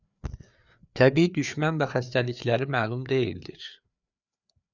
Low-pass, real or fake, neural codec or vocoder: 7.2 kHz; fake; codec, 16 kHz, 4 kbps, FreqCodec, larger model